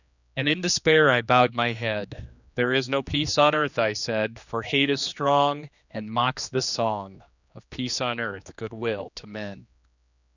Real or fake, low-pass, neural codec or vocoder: fake; 7.2 kHz; codec, 16 kHz, 2 kbps, X-Codec, HuBERT features, trained on general audio